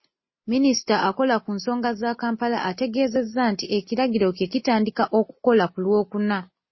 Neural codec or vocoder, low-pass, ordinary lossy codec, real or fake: none; 7.2 kHz; MP3, 24 kbps; real